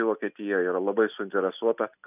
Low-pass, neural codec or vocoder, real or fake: 3.6 kHz; none; real